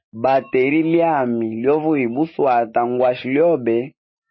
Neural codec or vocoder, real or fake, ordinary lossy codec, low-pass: none; real; MP3, 24 kbps; 7.2 kHz